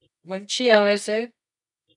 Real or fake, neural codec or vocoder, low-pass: fake; codec, 24 kHz, 0.9 kbps, WavTokenizer, medium music audio release; 10.8 kHz